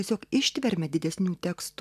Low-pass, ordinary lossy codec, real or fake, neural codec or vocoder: 14.4 kHz; AAC, 96 kbps; real; none